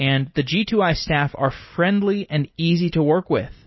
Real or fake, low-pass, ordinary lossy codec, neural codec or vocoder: real; 7.2 kHz; MP3, 24 kbps; none